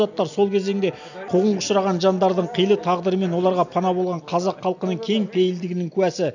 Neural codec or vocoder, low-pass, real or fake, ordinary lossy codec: none; 7.2 kHz; real; none